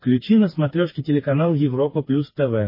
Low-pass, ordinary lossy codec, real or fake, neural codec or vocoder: 5.4 kHz; MP3, 24 kbps; fake; codec, 16 kHz, 4 kbps, FreqCodec, smaller model